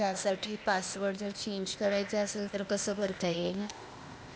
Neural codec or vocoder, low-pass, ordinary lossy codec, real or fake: codec, 16 kHz, 0.8 kbps, ZipCodec; none; none; fake